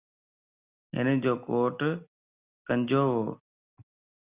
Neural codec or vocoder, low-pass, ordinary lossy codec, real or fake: none; 3.6 kHz; Opus, 64 kbps; real